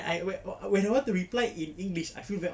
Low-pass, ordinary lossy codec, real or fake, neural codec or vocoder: none; none; real; none